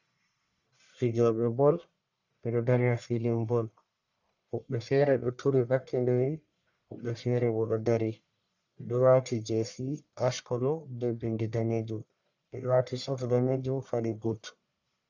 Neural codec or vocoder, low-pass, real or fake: codec, 44.1 kHz, 1.7 kbps, Pupu-Codec; 7.2 kHz; fake